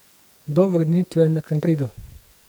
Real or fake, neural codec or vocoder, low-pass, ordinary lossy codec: fake; codec, 44.1 kHz, 2.6 kbps, SNAC; none; none